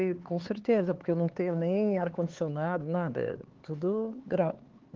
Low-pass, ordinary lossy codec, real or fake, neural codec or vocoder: 7.2 kHz; Opus, 16 kbps; fake; codec, 16 kHz, 4 kbps, X-Codec, HuBERT features, trained on LibriSpeech